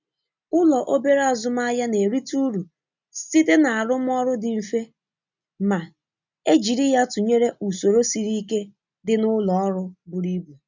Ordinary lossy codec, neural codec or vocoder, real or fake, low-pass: none; none; real; 7.2 kHz